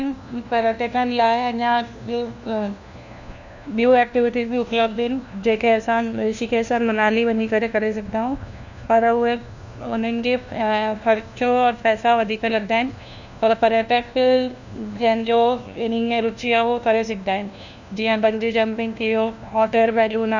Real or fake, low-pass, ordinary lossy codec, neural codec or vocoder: fake; 7.2 kHz; none; codec, 16 kHz, 1 kbps, FunCodec, trained on LibriTTS, 50 frames a second